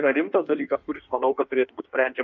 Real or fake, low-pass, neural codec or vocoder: fake; 7.2 kHz; codec, 16 kHz in and 24 kHz out, 1.1 kbps, FireRedTTS-2 codec